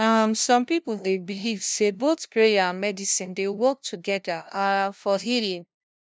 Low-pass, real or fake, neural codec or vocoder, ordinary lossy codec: none; fake; codec, 16 kHz, 0.5 kbps, FunCodec, trained on LibriTTS, 25 frames a second; none